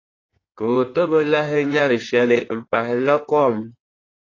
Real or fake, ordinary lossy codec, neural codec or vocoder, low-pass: fake; AAC, 32 kbps; codec, 16 kHz in and 24 kHz out, 1.1 kbps, FireRedTTS-2 codec; 7.2 kHz